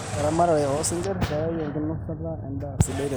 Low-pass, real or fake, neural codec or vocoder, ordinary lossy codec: none; real; none; none